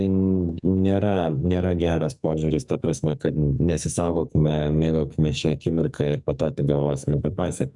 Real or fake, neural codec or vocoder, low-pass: fake; codec, 44.1 kHz, 2.6 kbps, SNAC; 10.8 kHz